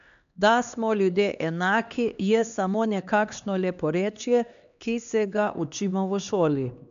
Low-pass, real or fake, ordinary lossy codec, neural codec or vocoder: 7.2 kHz; fake; none; codec, 16 kHz, 2 kbps, X-Codec, HuBERT features, trained on LibriSpeech